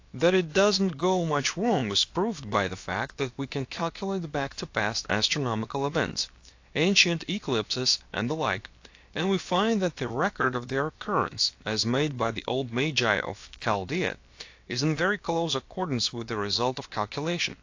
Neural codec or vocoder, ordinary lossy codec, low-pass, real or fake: codec, 16 kHz, about 1 kbps, DyCAST, with the encoder's durations; AAC, 48 kbps; 7.2 kHz; fake